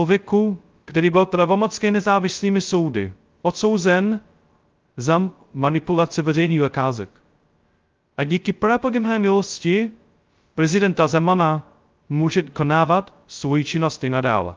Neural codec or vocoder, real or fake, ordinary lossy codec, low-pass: codec, 16 kHz, 0.2 kbps, FocalCodec; fake; Opus, 24 kbps; 7.2 kHz